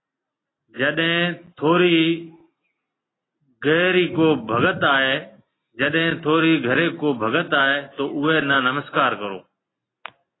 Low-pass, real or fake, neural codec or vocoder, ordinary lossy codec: 7.2 kHz; real; none; AAC, 16 kbps